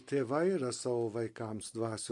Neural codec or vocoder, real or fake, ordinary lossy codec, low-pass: none; real; MP3, 48 kbps; 10.8 kHz